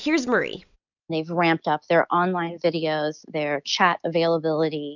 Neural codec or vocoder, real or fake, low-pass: none; real; 7.2 kHz